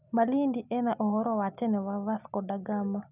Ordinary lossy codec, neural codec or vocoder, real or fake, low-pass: none; none; real; 3.6 kHz